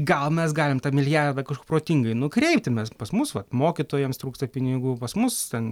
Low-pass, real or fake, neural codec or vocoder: 19.8 kHz; real; none